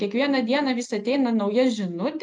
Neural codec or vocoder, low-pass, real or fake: none; 9.9 kHz; real